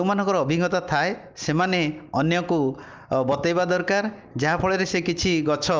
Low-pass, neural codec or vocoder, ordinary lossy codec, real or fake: 7.2 kHz; none; Opus, 32 kbps; real